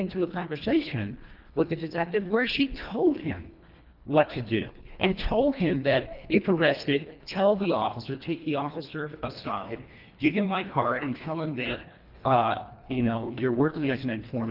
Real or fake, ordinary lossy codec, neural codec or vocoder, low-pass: fake; Opus, 32 kbps; codec, 24 kHz, 1.5 kbps, HILCodec; 5.4 kHz